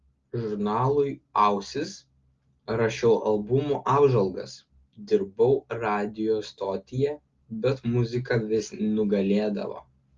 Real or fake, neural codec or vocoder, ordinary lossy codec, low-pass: real; none; Opus, 32 kbps; 7.2 kHz